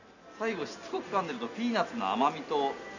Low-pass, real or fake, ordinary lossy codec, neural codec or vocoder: 7.2 kHz; real; none; none